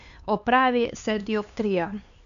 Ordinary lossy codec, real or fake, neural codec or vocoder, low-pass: none; fake; codec, 16 kHz, 4 kbps, X-Codec, HuBERT features, trained on LibriSpeech; 7.2 kHz